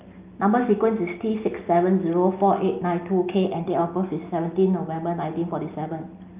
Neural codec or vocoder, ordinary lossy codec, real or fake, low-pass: none; Opus, 24 kbps; real; 3.6 kHz